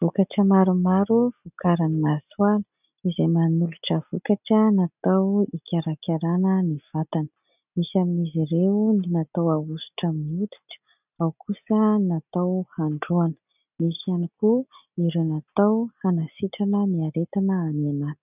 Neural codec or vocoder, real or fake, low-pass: none; real; 3.6 kHz